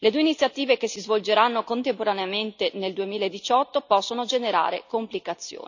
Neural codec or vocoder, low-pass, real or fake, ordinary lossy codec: none; 7.2 kHz; real; none